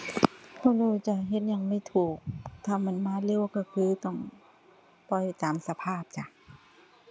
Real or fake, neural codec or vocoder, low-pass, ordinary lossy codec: real; none; none; none